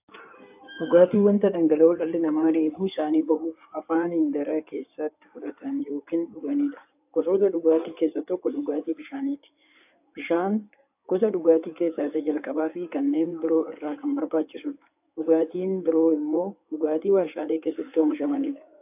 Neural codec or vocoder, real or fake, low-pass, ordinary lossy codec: codec, 16 kHz in and 24 kHz out, 2.2 kbps, FireRedTTS-2 codec; fake; 3.6 kHz; AAC, 32 kbps